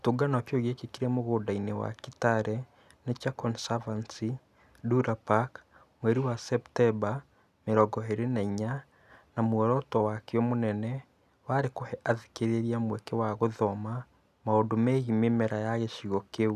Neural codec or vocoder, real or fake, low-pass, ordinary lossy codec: none; real; 14.4 kHz; none